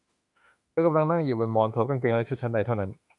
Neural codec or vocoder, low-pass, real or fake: autoencoder, 48 kHz, 32 numbers a frame, DAC-VAE, trained on Japanese speech; 10.8 kHz; fake